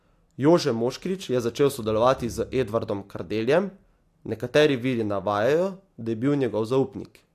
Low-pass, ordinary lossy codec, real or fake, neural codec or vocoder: 14.4 kHz; AAC, 64 kbps; real; none